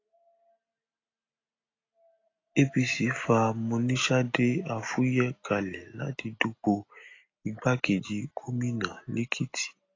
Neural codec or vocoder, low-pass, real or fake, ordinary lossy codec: none; 7.2 kHz; real; AAC, 32 kbps